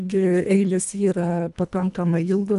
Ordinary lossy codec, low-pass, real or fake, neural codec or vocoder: MP3, 96 kbps; 10.8 kHz; fake; codec, 24 kHz, 1.5 kbps, HILCodec